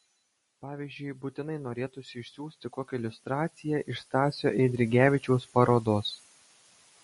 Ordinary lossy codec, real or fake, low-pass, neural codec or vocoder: MP3, 48 kbps; real; 14.4 kHz; none